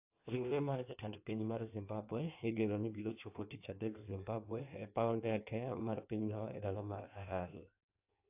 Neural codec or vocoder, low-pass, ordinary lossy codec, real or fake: codec, 16 kHz in and 24 kHz out, 1.1 kbps, FireRedTTS-2 codec; 3.6 kHz; AAC, 32 kbps; fake